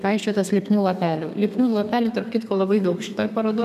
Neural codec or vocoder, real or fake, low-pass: codec, 32 kHz, 1.9 kbps, SNAC; fake; 14.4 kHz